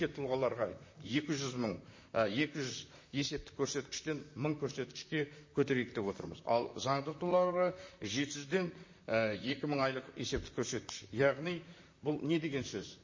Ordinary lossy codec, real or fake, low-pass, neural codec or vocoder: MP3, 32 kbps; fake; 7.2 kHz; vocoder, 44.1 kHz, 128 mel bands, Pupu-Vocoder